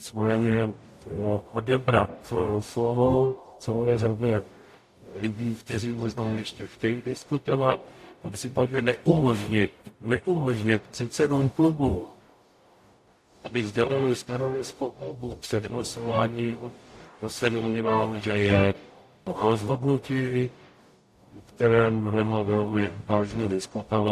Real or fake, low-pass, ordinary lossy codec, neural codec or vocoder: fake; 14.4 kHz; AAC, 64 kbps; codec, 44.1 kHz, 0.9 kbps, DAC